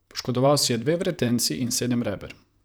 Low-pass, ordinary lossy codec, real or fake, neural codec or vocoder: none; none; fake; vocoder, 44.1 kHz, 128 mel bands, Pupu-Vocoder